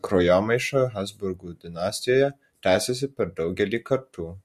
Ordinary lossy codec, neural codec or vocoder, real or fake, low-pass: MP3, 64 kbps; none; real; 14.4 kHz